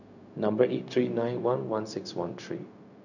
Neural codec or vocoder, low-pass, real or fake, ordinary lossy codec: codec, 16 kHz, 0.4 kbps, LongCat-Audio-Codec; 7.2 kHz; fake; none